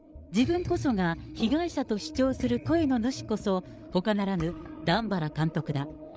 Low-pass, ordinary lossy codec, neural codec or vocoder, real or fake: none; none; codec, 16 kHz, 8 kbps, FreqCodec, larger model; fake